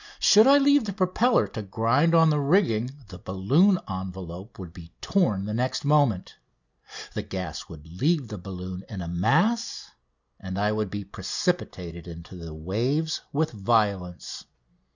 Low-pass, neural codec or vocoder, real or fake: 7.2 kHz; none; real